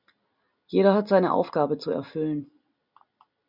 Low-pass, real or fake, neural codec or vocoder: 5.4 kHz; real; none